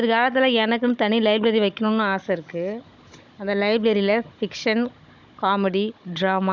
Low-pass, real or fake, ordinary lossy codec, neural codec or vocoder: 7.2 kHz; fake; none; codec, 16 kHz, 16 kbps, FunCodec, trained on Chinese and English, 50 frames a second